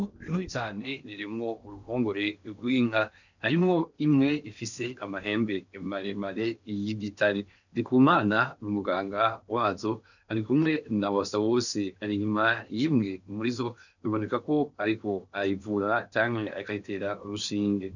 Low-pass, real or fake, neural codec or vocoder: 7.2 kHz; fake; codec, 16 kHz in and 24 kHz out, 0.8 kbps, FocalCodec, streaming, 65536 codes